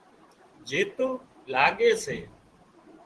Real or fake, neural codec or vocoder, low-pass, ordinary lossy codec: fake; vocoder, 44.1 kHz, 128 mel bands every 512 samples, BigVGAN v2; 10.8 kHz; Opus, 16 kbps